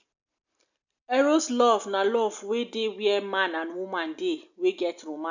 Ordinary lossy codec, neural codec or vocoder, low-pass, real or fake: none; none; 7.2 kHz; real